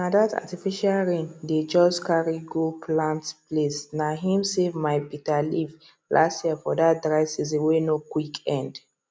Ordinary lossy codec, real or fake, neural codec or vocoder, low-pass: none; real; none; none